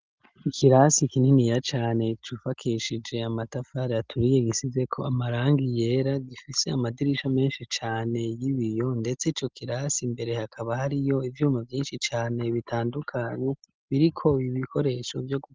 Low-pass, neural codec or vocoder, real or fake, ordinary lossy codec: 7.2 kHz; none; real; Opus, 24 kbps